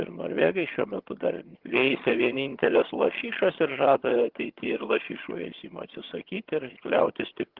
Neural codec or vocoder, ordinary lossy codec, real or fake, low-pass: vocoder, 22.05 kHz, 80 mel bands, HiFi-GAN; Opus, 16 kbps; fake; 5.4 kHz